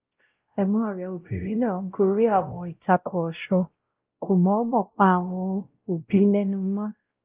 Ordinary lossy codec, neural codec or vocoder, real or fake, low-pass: Opus, 32 kbps; codec, 16 kHz, 0.5 kbps, X-Codec, WavLM features, trained on Multilingual LibriSpeech; fake; 3.6 kHz